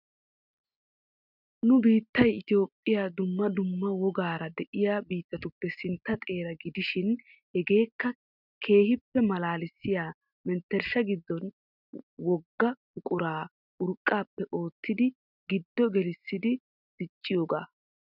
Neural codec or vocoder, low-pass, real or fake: none; 5.4 kHz; real